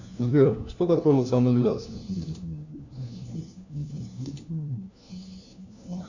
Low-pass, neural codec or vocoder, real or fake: 7.2 kHz; codec, 16 kHz, 1 kbps, FunCodec, trained on LibriTTS, 50 frames a second; fake